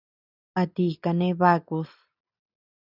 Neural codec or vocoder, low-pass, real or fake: none; 5.4 kHz; real